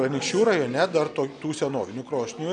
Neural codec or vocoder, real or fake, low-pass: none; real; 9.9 kHz